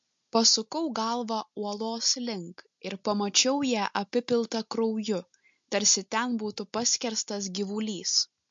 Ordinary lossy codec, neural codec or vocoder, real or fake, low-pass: MP3, 48 kbps; none; real; 7.2 kHz